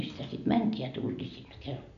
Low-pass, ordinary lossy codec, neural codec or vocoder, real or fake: 7.2 kHz; none; none; real